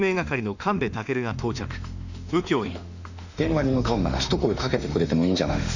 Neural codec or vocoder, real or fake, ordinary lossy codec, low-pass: autoencoder, 48 kHz, 32 numbers a frame, DAC-VAE, trained on Japanese speech; fake; none; 7.2 kHz